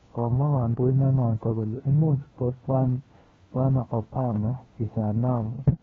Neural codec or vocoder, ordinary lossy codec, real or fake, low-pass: codec, 16 kHz, 1 kbps, FunCodec, trained on LibriTTS, 50 frames a second; AAC, 24 kbps; fake; 7.2 kHz